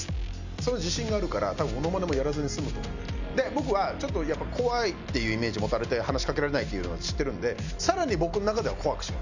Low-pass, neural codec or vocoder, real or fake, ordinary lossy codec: 7.2 kHz; none; real; none